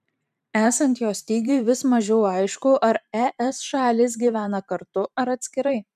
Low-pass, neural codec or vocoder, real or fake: 14.4 kHz; vocoder, 44.1 kHz, 128 mel bands every 512 samples, BigVGAN v2; fake